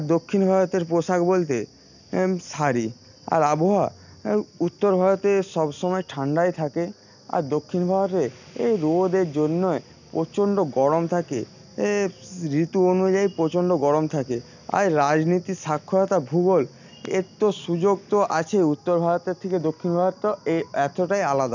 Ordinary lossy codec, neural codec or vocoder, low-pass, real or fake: none; none; 7.2 kHz; real